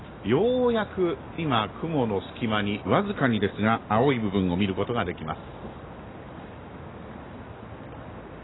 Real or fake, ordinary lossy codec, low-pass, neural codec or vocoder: real; AAC, 16 kbps; 7.2 kHz; none